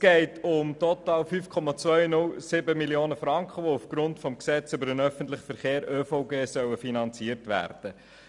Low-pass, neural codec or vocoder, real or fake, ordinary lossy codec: 10.8 kHz; none; real; none